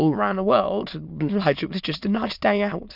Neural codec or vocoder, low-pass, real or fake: autoencoder, 22.05 kHz, a latent of 192 numbers a frame, VITS, trained on many speakers; 5.4 kHz; fake